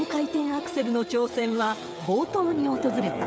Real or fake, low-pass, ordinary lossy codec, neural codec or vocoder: fake; none; none; codec, 16 kHz, 8 kbps, FreqCodec, smaller model